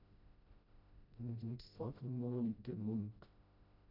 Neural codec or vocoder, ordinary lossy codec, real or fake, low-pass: codec, 16 kHz, 0.5 kbps, FreqCodec, smaller model; none; fake; 5.4 kHz